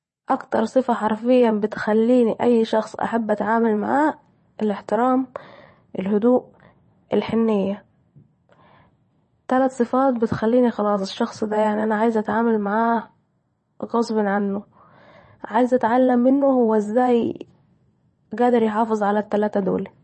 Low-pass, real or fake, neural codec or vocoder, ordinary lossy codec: 9.9 kHz; fake; vocoder, 22.05 kHz, 80 mel bands, WaveNeXt; MP3, 32 kbps